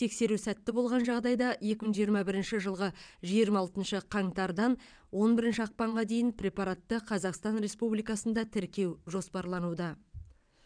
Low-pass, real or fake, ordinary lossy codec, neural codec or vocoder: 9.9 kHz; fake; none; vocoder, 22.05 kHz, 80 mel bands, WaveNeXt